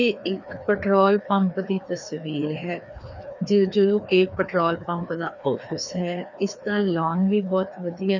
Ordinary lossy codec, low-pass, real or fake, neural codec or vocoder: none; 7.2 kHz; fake; codec, 16 kHz, 2 kbps, FreqCodec, larger model